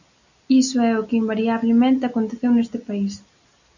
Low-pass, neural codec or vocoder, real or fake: 7.2 kHz; none; real